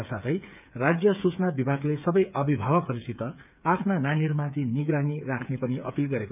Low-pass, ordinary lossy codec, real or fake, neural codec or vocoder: 3.6 kHz; none; fake; codec, 16 kHz, 8 kbps, FreqCodec, smaller model